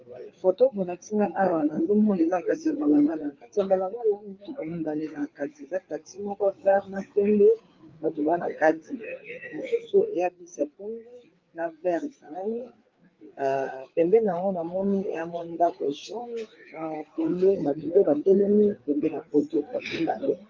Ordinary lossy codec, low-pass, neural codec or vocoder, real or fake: Opus, 32 kbps; 7.2 kHz; codec, 16 kHz, 4 kbps, FreqCodec, larger model; fake